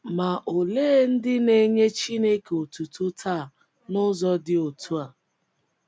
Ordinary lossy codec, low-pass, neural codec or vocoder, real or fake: none; none; none; real